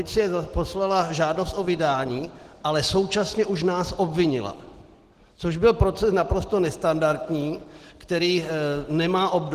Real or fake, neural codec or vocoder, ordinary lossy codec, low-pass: real; none; Opus, 16 kbps; 14.4 kHz